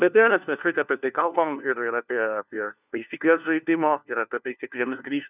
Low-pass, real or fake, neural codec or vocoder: 3.6 kHz; fake; codec, 16 kHz, 1 kbps, FunCodec, trained on LibriTTS, 50 frames a second